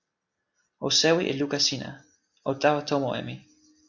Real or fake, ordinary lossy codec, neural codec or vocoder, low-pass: real; Opus, 64 kbps; none; 7.2 kHz